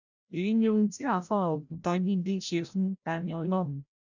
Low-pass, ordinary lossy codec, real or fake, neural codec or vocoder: 7.2 kHz; none; fake; codec, 16 kHz, 0.5 kbps, FreqCodec, larger model